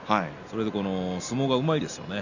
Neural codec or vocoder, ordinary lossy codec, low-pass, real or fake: none; none; 7.2 kHz; real